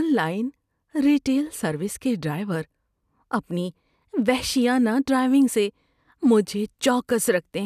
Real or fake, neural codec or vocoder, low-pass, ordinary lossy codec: real; none; 14.4 kHz; none